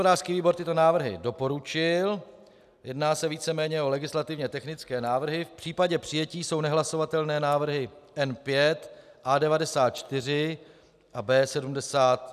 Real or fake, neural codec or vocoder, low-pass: real; none; 14.4 kHz